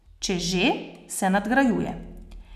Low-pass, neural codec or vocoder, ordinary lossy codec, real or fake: 14.4 kHz; none; none; real